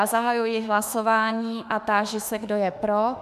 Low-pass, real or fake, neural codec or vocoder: 14.4 kHz; fake; autoencoder, 48 kHz, 32 numbers a frame, DAC-VAE, trained on Japanese speech